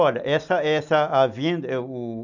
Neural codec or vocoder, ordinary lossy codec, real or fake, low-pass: none; none; real; 7.2 kHz